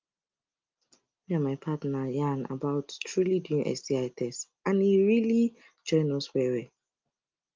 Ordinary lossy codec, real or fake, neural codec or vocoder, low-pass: Opus, 24 kbps; real; none; 7.2 kHz